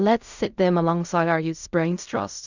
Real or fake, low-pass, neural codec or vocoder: fake; 7.2 kHz; codec, 16 kHz in and 24 kHz out, 0.4 kbps, LongCat-Audio-Codec, fine tuned four codebook decoder